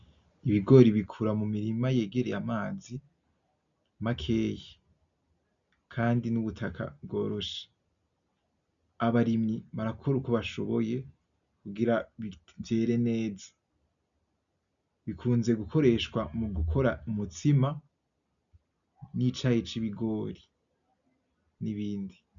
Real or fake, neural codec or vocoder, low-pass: real; none; 7.2 kHz